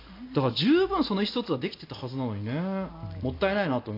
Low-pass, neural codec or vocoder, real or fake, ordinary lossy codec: 5.4 kHz; none; real; AAC, 32 kbps